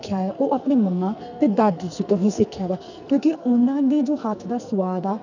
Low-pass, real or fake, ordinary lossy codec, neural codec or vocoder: 7.2 kHz; fake; none; codec, 44.1 kHz, 2.6 kbps, SNAC